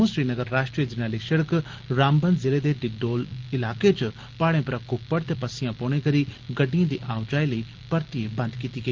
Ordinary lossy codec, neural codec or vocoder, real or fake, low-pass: Opus, 16 kbps; none; real; 7.2 kHz